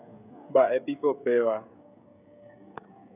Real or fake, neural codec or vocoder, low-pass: fake; codec, 16 kHz, 16 kbps, FreqCodec, smaller model; 3.6 kHz